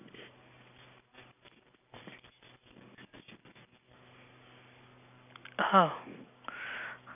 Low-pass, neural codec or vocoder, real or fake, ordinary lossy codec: 3.6 kHz; none; real; none